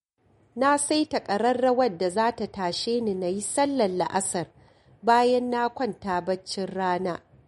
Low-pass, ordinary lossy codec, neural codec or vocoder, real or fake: 19.8 kHz; MP3, 48 kbps; none; real